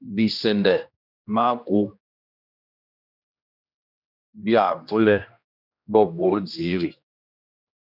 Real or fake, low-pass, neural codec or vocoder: fake; 5.4 kHz; codec, 16 kHz, 1 kbps, X-Codec, HuBERT features, trained on general audio